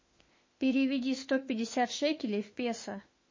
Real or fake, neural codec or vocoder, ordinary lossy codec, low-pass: fake; autoencoder, 48 kHz, 32 numbers a frame, DAC-VAE, trained on Japanese speech; MP3, 32 kbps; 7.2 kHz